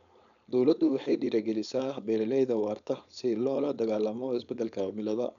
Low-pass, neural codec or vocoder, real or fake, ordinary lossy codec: 7.2 kHz; codec, 16 kHz, 4.8 kbps, FACodec; fake; none